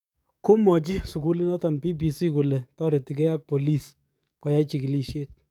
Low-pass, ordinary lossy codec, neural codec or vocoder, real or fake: 19.8 kHz; none; codec, 44.1 kHz, 7.8 kbps, DAC; fake